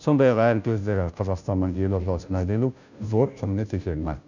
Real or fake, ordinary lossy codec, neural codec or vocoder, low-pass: fake; none; codec, 16 kHz, 0.5 kbps, FunCodec, trained on Chinese and English, 25 frames a second; 7.2 kHz